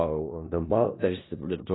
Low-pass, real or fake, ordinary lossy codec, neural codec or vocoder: 7.2 kHz; fake; AAC, 16 kbps; codec, 16 kHz in and 24 kHz out, 0.4 kbps, LongCat-Audio-Codec, four codebook decoder